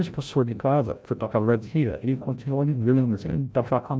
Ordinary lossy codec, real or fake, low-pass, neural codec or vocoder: none; fake; none; codec, 16 kHz, 0.5 kbps, FreqCodec, larger model